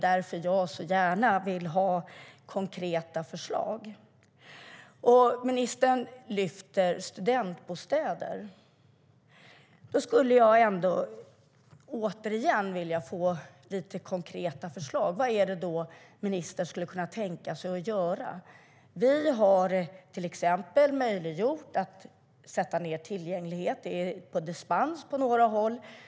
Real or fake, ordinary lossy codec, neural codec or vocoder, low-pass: real; none; none; none